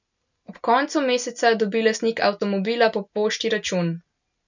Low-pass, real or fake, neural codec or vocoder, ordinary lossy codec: 7.2 kHz; real; none; none